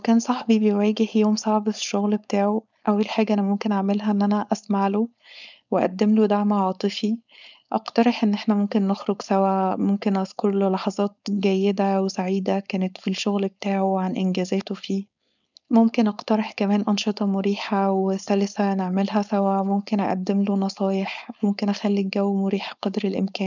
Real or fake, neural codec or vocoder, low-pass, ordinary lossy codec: fake; codec, 16 kHz, 4.8 kbps, FACodec; 7.2 kHz; none